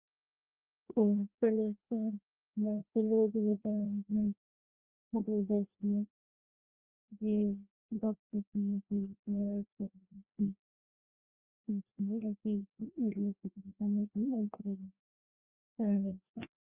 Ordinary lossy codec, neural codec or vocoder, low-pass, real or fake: Opus, 32 kbps; codec, 16 kHz, 1 kbps, FreqCodec, larger model; 3.6 kHz; fake